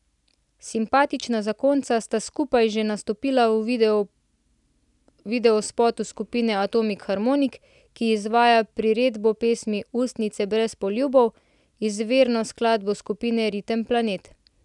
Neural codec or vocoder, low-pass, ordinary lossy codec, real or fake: none; 10.8 kHz; none; real